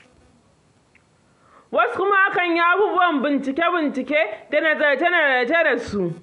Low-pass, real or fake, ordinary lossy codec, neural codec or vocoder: 10.8 kHz; real; none; none